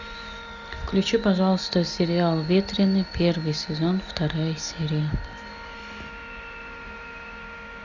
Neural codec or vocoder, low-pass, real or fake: none; 7.2 kHz; real